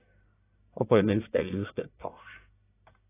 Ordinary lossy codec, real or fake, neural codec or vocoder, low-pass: AAC, 16 kbps; fake; codec, 44.1 kHz, 1.7 kbps, Pupu-Codec; 3.6 kHz